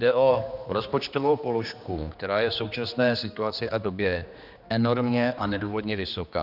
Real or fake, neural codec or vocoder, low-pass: fake; codec, 16 kHz, 2 kbps, X-Codec, HuBERT features, trained on general audio; 5.4 kHz